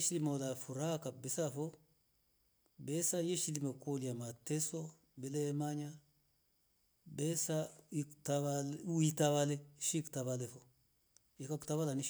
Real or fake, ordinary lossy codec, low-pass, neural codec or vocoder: real; none; none; none